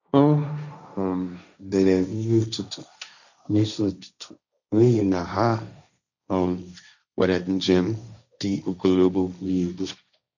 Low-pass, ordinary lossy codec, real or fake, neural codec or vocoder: 7.2 kHz; none; fake; codec, 16 kHz, 1.1 kbps, Voila-Tokenizer